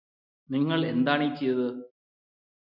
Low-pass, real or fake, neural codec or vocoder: 5.4 kHz; real; none